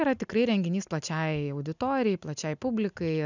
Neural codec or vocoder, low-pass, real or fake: none; 7.2 kHz; real